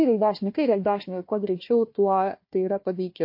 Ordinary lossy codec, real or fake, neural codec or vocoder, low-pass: MP3, 32 kbps; fake; codec, 16 kHz, 1 kbps, FunCodec, trained on Chinese and English, 50 frames a second; 5.4 kHz